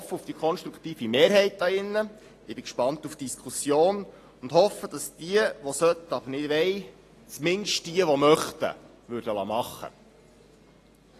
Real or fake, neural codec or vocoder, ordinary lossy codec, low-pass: real; none; AAC, 48 kbps; 14.4 kHz